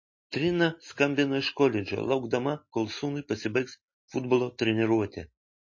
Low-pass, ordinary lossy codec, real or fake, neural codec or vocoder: 7.2 kHz; MP3, 32 kbps; real; none